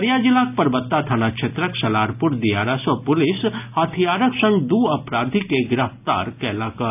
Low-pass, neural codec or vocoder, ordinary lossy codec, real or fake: 3.6 kHz; none; AAC, 32 kbps; real